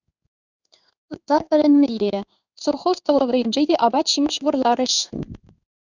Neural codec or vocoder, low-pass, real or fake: codec, 16 kHz in and 24 kHz out, 1 kbps, XY-Tokenizer; 7.2 kHz; fake